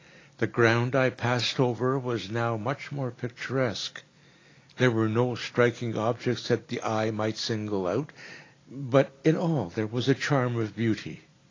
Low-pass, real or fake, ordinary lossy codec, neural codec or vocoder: 7.2 kHz; real; AAC, 32 kbps; none